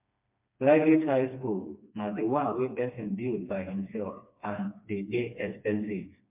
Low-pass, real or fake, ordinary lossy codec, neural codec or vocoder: 3.6 kHz; fake; MP3, 32 kbps; codec, 16 kHz, 2 kbps, FreqCodec, smaller model